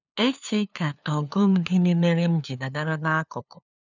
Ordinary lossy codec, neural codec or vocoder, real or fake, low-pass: none; codec, 16 kHz, 2 kbps, FunCodec, trained on LibriTTS, 25 frames a second; fake; 7.2 kHz